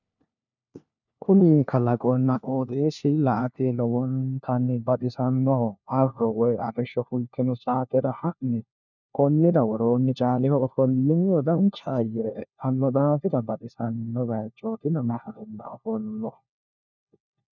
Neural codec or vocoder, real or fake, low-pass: codec, 16 kHz, 1 kbps, FunCodec, trained on LibriTTS, 50 frames a second; fake; 7.2 kHz